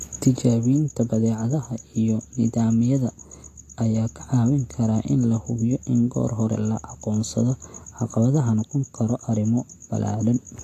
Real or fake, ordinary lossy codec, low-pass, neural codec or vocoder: real; AAC, 48 kbps; 14.4 kHz; none